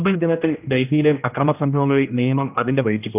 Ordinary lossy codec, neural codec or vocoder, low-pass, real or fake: none; codec, 16 kHz, 1 kbps, X-Codec, HuBERT features, trained on general audio; 3.6 kHz; fake